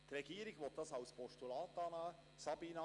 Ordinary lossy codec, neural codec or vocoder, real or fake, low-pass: Opus, 64 kbps; none; real; 10.8 kHz